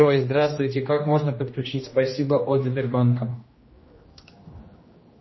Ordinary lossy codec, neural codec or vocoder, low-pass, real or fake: MP3, 24 kbps; codec, 16 kHz, 1 kbps, X-Codec, HuBERT features, trained on general audio; 7.2 kHz; fake